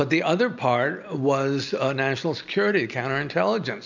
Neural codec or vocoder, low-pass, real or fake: none; 7.2 kHz; real